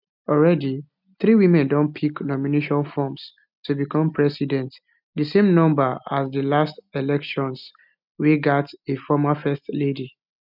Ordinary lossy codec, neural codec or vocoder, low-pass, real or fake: none; none; 5.4 kHz; real